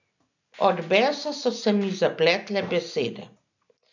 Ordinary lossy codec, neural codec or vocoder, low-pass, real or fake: none; none; 7.2 kHz; real